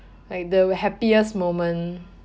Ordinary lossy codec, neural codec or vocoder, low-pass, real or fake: none; none; none; real